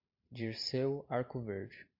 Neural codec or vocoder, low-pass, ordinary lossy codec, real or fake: vocoder, 44.1 kHz, 128 mel bands every 512 samples, BigVGAN v2; 5.4 kHz; MP3, 48 kbps; fake